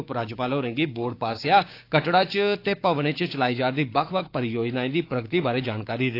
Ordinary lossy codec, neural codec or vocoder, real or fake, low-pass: AAC, 32 kbps; codec, 16 kHz, 6 kbps, DAC; fake; 5.4 kHz